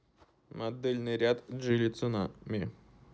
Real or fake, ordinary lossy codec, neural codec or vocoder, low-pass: real; none; none; none